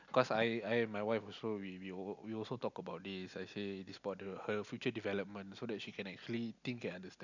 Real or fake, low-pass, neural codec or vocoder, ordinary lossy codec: real; 7.2 kHz; none; none